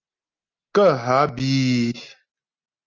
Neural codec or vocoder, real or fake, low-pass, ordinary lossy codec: none; real; 7.2 kHz; Opus, 32 kbps